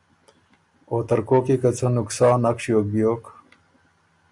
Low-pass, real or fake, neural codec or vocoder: 10.8 kHz; real; none